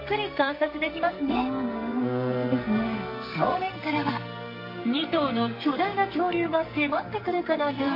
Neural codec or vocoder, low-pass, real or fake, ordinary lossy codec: codec, 44.1 kHz, 2.6 kbps, SNAC; 5.4 kHz; fake; MP3, 32 kbps